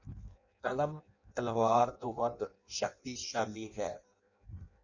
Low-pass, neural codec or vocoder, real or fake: 7.2 kHz; codec, 16 kHz in and 24 kHz out, 0.6 kbps, FireRedTTS-2 codec; fake